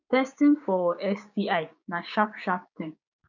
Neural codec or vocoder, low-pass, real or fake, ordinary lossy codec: codec, 16 kHz, 6 kbps, DAC; 7.2 kHz; fake; none